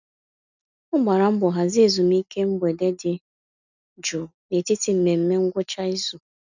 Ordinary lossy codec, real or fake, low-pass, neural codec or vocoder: none; real; 7.2 kHz; none